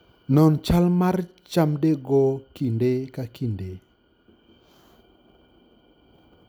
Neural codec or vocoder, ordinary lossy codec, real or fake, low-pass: none; none; real; none